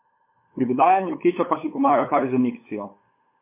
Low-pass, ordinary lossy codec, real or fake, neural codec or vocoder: 3.6 kHz; MP3, 24 kbps; fake; codec, 16 kHz, 8 kbps, FunCodec, trained on LibriTTS, 25 frames a second